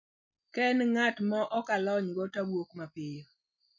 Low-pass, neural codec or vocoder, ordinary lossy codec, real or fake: 7.2 kHz; none; none; real